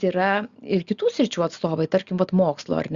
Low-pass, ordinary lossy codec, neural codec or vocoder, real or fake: 7.2 kHz; Opus, 64 kbps; none; real